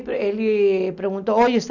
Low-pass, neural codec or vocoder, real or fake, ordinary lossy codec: 7.2 kHz; none; real; none